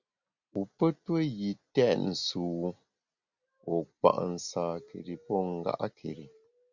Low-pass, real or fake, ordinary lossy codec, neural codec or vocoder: 7.2 kHz; real; Opus, 64 kbps; none